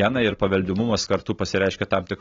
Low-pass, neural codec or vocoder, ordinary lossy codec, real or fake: 7.2 kHz; none; AAC, 24 kbps; real